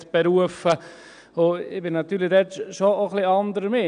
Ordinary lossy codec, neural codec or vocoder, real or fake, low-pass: none; none; real; 9.9 kHz